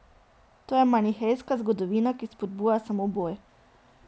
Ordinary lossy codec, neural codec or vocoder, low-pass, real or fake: none; none; none; real